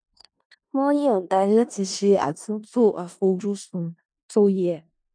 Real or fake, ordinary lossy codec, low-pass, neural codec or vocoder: fake; none; 9.9 kHz; codec, 16 kHz in and 24 kHz out, 0.4 kbps, LongCat-Audio-Codec, four codebook decoder